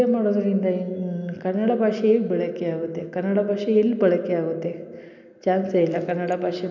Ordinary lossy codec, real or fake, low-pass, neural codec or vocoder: none; real; 7.2 kHz; none